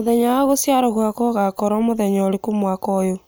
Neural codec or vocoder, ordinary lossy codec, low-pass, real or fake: none; none; none; real